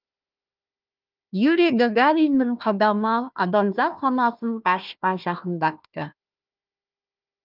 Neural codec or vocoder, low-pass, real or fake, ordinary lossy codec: codec, 16 kHz, 1 kbps, FunCodec, trained on Chinese and English, 50 frames a second; 5.4 kHz; fake; Opus, 24 kbps